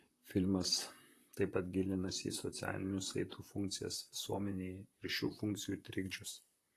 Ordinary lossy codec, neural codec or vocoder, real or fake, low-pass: AAC, 48 kbps; vocoder, 44.1 kHz, 128 mel bands, Pupu-Vocoder; fake; 14.4 kHz